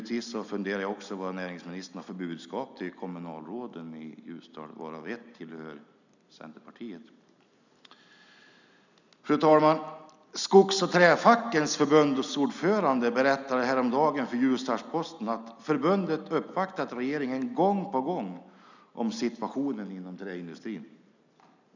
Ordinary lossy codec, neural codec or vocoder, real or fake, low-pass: none; none; real; 7.2 kHz